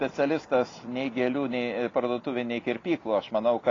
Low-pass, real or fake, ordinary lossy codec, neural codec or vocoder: 7.2 kHz; real; AAC, 32 kbps; none